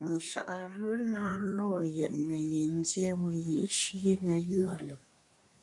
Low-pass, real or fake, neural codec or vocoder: 10.8 kHz; fake; codec, 24 kHz, 1 kbps, SNAC